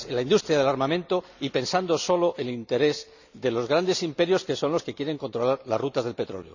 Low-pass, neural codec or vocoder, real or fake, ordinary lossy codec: 7.2 kHz; none; real; none